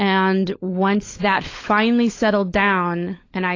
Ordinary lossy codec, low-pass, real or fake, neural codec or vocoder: AAC, 32 kbps; 7.2 kHz; fake; codec, 16 kHz, 16 kbps, FunCodec, trained on LibriTTS, 50 frames a second